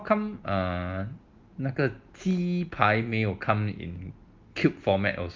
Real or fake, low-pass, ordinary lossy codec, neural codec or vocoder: real; 7.2 kHz; Opus, 32 kbps; none